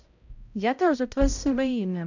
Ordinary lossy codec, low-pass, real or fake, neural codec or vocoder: none; 7.2 kHz; fake; codec, 16 kHz, 0.5 kbps, X-Codec, HuBERT features, trained on balanced general audio